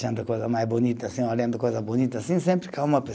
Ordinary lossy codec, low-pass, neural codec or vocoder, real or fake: none; none; none; real